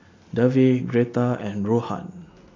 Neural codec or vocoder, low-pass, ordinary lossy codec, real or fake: none; 7.2 kHz; AAC, 32 kbps; real